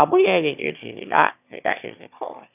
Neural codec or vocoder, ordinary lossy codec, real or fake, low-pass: autoencoder, 22.05 kHz, a latent of 192 numbers a frame, VITS, trained on one speaker; none; fake; 3.6 kHz